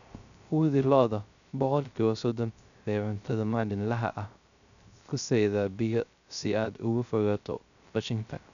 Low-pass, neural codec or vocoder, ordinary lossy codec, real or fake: 7.2 kHz; codec, 16 kHz, 0.3 kbps, FocalCodec; none; fake